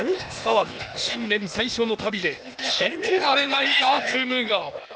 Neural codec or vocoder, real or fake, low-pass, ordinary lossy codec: codec, 16 kHz, 0.8 kbps, ZipCodec; fake; none; none